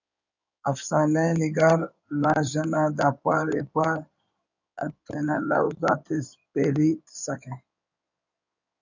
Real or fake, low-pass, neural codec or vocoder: fake; 7.2 kHz; codec, 16 kHz in and 24 kHz out, 2.2 kbps, FireRedTTS-2 codec